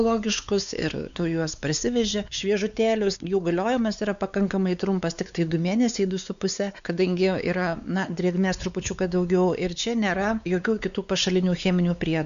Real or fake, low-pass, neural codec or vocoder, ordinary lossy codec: fake; 7.2 kHz; codec, 16 kHz, 4 kbps, X-Codec, WavLM features, trained on Multilingual LibriSpeech; MP3, 96 kbps